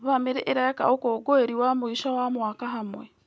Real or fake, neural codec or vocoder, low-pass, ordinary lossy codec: real; none; none; none